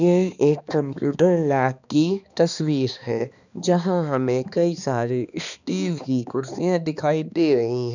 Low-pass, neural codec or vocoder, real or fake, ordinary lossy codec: 7.2 kHz; codec, 16 kHz, 2 kbps, X-Codec, HuBERT features, trained on balanced general audio; fake; none